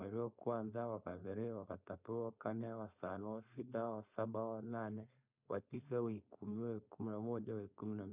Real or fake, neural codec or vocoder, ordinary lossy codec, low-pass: fake; codec, 16 kHz, 4.8 kbps, FACodec; none; 3.6 kHz